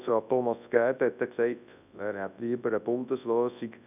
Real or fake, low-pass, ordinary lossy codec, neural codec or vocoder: fake; 3.6 kHz; none; codec, 24 kHz, 0.9 kbps, WavTokenizer, large speech release